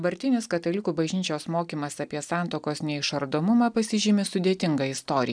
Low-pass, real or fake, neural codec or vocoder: 9.9 kHz; real; none